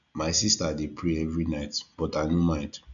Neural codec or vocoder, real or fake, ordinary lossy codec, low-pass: none; real; none; 7.2 kHz